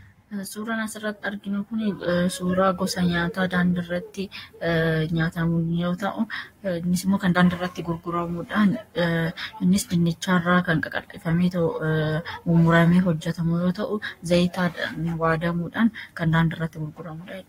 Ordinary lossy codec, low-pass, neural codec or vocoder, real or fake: AAC, 48 kbps; 19.8 kHz; codec, 44.1 kHz, 7.8 kbps, Pupu-Codec; fake